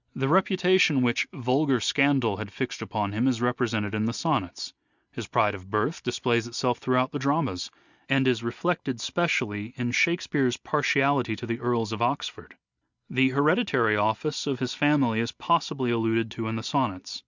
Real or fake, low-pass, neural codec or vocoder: real; 7.2 kHz; none